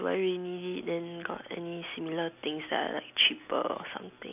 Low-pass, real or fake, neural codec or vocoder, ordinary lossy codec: 3.6 kHz; real; none; none